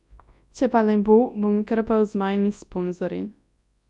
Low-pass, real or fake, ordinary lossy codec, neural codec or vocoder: 10.8 kHz; fake; MP3, 96 kbps; codec, 24 kHz, 0.9 kbps, WavTokenizer, large speech release